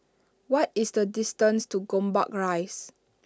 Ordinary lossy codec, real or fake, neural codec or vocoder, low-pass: none; real; none; none